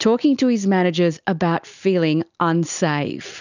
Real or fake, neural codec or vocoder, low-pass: real; none; 7.2 kHz